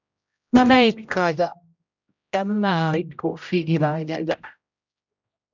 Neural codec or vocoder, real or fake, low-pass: codec, 16 kHz, 0.5 kbps, X-Codec, HuBERT features, trained on general audio; fake; 7.2 kHz